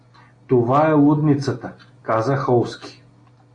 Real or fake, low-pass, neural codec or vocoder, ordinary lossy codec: real; 9.9 kHz; none; AAC, 48 kbps